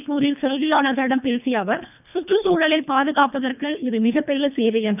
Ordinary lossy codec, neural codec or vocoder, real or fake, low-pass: none; codec, 24 kHz, 1.5 kbps, HILCodec; fake; 3.6 kHz